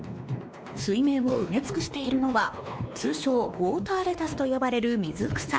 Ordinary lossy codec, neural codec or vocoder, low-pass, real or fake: none; codec, 16 kHz, 2 kbps, X-Codec, WavLM features, trained on Multilingual LibriSpeech; none; fake